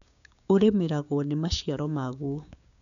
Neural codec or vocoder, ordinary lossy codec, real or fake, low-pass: codec, 16 kHz, 6 kbps, DAC; none; fake; 7.2 kHz